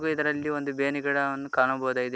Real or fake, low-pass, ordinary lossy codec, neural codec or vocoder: real; none; none; none